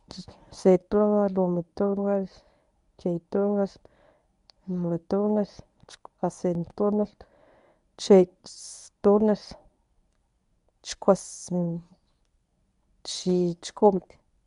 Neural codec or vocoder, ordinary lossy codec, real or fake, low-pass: codec, 24 kHz, 0.9 kbps, WavTokenizer, medium speech release version 1; none; fake; 10.8 kHz